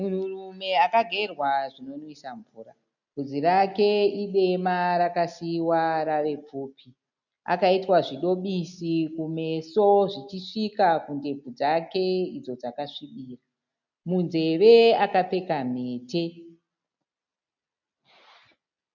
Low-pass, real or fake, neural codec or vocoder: 7.2 kHz; real; none